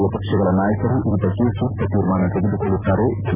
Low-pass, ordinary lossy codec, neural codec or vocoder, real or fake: 3.6 kHz; none; vocoder, 44.1 kHz, 128 mel bands every 256 samples, BigVGAN v2; fake